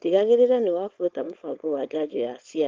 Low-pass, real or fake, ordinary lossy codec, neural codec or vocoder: 7.2 kHz; fake; Opus, 24 kbps; codec, 16 kHz, 4.8 kbps, FACodec